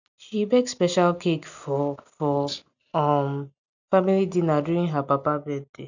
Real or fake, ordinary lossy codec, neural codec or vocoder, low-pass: real; none; none; 7.2 kHz